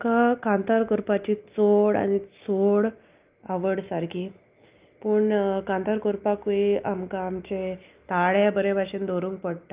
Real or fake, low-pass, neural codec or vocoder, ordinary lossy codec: real; 3.6 kHz; none; Opus, 24 kbps